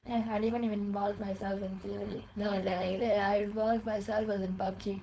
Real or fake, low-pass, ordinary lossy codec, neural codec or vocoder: fake; none; none; codec, 16 kHz, 4.8 kbps, FACodec